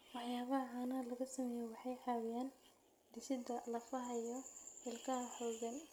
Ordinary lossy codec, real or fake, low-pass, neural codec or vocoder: none; real; none; none